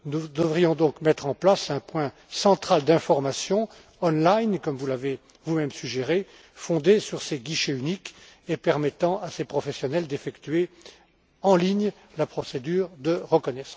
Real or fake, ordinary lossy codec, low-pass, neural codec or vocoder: real; none; none; none